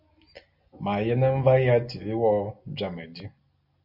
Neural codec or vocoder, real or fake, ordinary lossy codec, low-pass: none; real; MP3, 48 kbps; 5.4 kHz